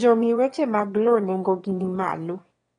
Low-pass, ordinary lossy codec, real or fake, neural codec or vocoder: 9.9 kHz; AAC, 32 kbps; fake; autoencoder, 22.05 kHz, a latent of 192 numbers a frame, VITS, trained on one speaker